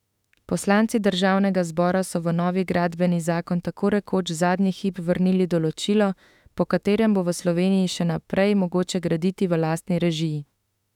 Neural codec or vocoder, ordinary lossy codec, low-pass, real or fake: autoencoder, 48 kHz, 32 numbers a frame, DAC-VAE, trained on Japanese speech; none; 19.8 kHz; fake